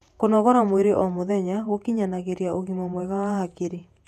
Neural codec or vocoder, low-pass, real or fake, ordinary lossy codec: vocoder, 48 kHz, 128 mel bands, Vocos; 14.4 kHz; fake; none